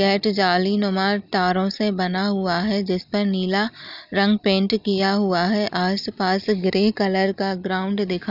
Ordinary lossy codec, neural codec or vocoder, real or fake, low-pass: none; none; real; 5.4 kHz